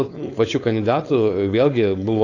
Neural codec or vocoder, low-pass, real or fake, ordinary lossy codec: codec, 16 kHz, 4.8 kbps, FACodec; 7.2 kHz; fake; AAC, 48 kbps